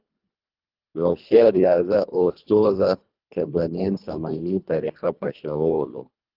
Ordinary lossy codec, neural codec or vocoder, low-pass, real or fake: Opus, 24 kbps; codec, 24 kHz, 1.5 kbps, HILCodec; 5.4 kHz; fake